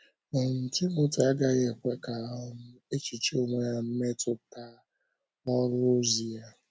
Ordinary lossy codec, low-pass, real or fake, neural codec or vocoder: none; none; real; none